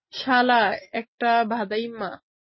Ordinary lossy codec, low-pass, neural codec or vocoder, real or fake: MP3, 24 kbps; 7.2 kHz; none; real